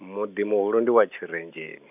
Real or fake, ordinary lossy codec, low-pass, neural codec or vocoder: real; none; 3.6 kHz; none